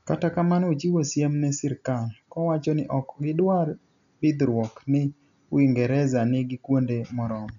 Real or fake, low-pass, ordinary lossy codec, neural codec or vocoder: real; 7.2 kHz; none; none